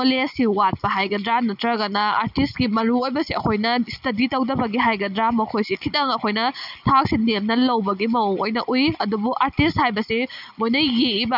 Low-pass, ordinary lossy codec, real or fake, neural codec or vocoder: 5.4 kHz; none; real; none